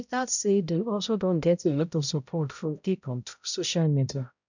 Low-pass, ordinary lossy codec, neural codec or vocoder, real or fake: 7.2 kHz; none; codec, 16 kHz, 0.5 kbps, X-Codec, HuBERT features, trained on balanced general audio; fake